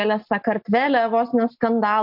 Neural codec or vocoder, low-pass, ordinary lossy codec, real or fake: none; 5.4 kHz; MP3, 48 kbps; real